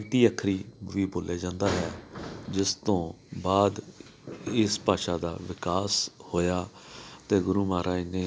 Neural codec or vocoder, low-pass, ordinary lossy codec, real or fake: none; none; none; real